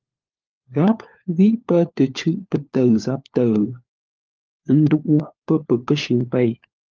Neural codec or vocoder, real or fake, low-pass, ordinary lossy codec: codec, 16 kHz, 4 kbps, FunCodec, trained on LibriTTS, 50 frames a second; fake; 7.2 kHz; Opus, 32 kbps